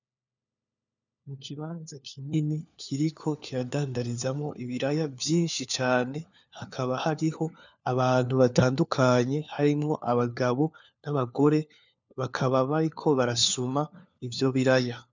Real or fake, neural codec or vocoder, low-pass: fake; codec, 16 kHz, 4 kbps, FunCodec, trained on LibriTTS, 50 frames a second; 7.2 kHz